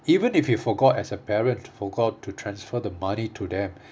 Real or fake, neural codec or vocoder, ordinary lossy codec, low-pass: real; none; none; none